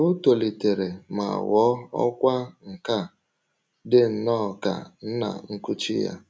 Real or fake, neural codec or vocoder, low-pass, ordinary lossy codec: real; none; none; none